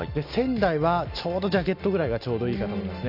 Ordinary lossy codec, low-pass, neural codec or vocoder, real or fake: none; 5.4 kHz; none; real